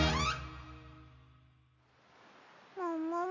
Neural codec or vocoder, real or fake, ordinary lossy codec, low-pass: none; real; none; 7.2 kHz